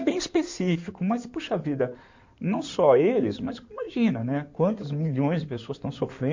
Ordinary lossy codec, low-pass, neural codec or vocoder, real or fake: MP3, 48 kbps; 7.2 kHz; codec, 16 kHz in and 24 kHz out, 2.2 kbps, FireRedTTS-2 codec; fake